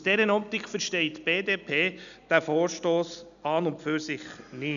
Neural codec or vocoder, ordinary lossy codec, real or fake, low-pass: none; none; real; 7.2 kHz